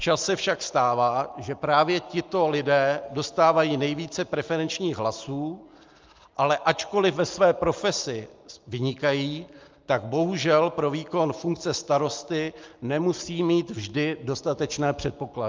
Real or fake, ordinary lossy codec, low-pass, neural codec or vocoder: real; Opus, 24 kbps; 7.2 kHz; none